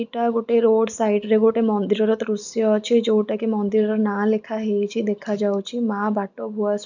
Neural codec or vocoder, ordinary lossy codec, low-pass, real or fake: none; none; 7.2 kHz; real